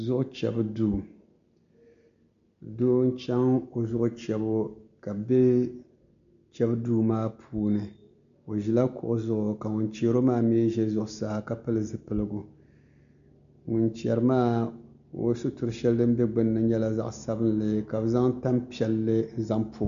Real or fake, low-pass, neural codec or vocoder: real; 7.2 kHz; none